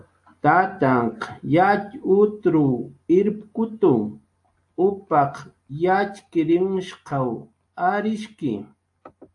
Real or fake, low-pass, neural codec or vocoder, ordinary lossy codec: real; 10.8 kHz; none; AAC, 64 kbps